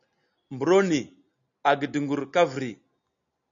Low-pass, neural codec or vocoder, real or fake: 7.2 kHz; none; real